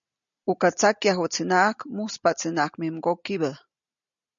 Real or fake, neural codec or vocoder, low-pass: real; none; 7.2 kHz